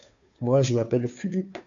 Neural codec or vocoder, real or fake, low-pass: codec, 16 kHz, 2 kbps, FunCodec, trained on Chinese and English, 25 frames a second; fake; 7.2 kHz